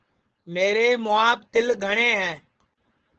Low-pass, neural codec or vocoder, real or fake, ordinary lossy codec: 7.2 kHz; codec, 16 kHz, 4.8 kbps, FACodec; fake; Opus, 16 kbps